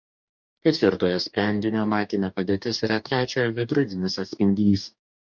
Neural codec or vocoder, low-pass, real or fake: codec, 44.1 kHz, 2.6 kbps, DAC; 7.2 kHz; fake